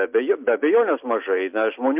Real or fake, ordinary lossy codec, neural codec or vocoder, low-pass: real; MP3, 32 kbps; none; 3.6 kHz